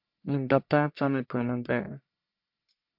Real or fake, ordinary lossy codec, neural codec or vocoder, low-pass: fake; MP3, 48 kbps; codec, 44.1 kHz, 1.7 kbps, Pupu-Codec; 5.4 kHz